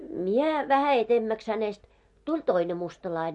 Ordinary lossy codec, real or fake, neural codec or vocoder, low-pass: MP3, 48 kbps; real; none; 10.8 kHz